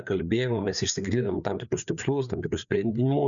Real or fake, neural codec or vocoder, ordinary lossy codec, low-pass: fake; codec, 16 kHz, 4 kbps, FreqCodec, larger model; MP3, 96 kbps; 7.2 kHz